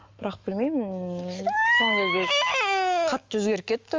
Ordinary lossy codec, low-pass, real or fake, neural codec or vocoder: Opus, 32 kbps; 7.2 kHz; fake; autoencoder, 48 kHz, 128 numbers a frame, DAC-VAE, trained on Japanese speech